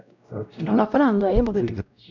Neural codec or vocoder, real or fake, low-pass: codec, 16 kHz, 0.5 kbps, X-Codec, WavLM features, trained on Multilingual LibriSpeech; fake; 7.2 kHz